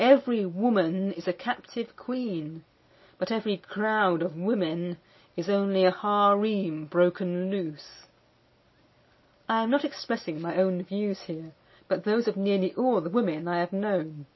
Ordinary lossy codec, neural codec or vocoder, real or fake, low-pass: MP3, 24 kbps; none; real; 7.2 kHz